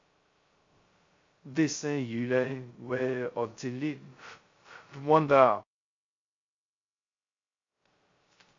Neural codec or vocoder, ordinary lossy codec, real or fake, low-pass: codec, 16 kHz, 0.2 kbps, FocalCodec; MP3, 48 kbps; fake; 7.2 kHz